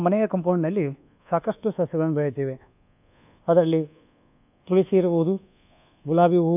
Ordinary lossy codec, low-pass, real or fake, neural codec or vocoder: none; 3.6 kHz; fake; codec, 24 kHz, 1.2 kbps, DualCodec